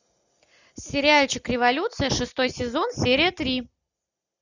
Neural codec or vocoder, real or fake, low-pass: none; real; 7.2 kHz